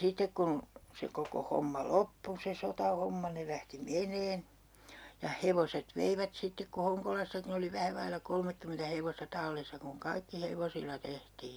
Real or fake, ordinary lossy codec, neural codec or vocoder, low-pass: real; none; none; none